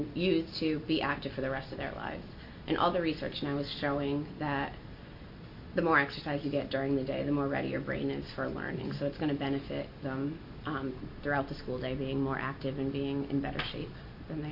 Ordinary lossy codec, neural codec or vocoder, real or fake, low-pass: MP3, 32 kbps; none; real; 5.4 kHz